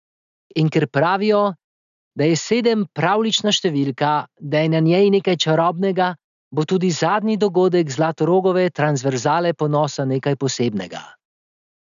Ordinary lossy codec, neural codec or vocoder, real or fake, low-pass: none; none; real; 7.2 kHz